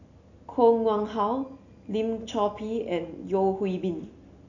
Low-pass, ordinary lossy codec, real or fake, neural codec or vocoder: 7.2 kHz; none; real; none